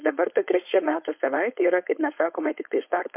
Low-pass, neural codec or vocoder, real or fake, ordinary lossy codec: 3.6 kHz; codec, 16 kHz, 4.8 kbps, FACodec; fake; MP3, 32 kbps